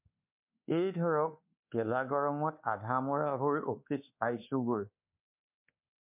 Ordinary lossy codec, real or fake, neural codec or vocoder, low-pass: MP3, 32 kbps; fake; codec, 24 kHz, 1.2 kbps, DualCodec; 3.6 kHz